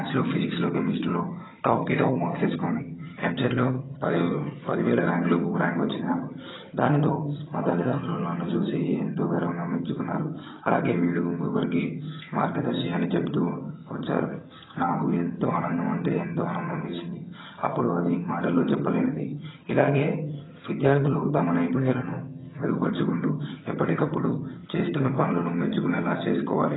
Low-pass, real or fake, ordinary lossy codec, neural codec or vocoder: 7.2 kHz; fake; AAC, 16 kbps; vocoder, 22.05 kHz, 80 mel bands, HiFi-GAN